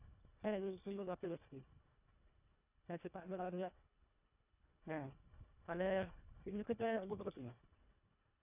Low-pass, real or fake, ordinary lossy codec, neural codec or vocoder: 3.6 kHz; fake; none; codec, 24 kHz, 1.5 kbps, HILCodec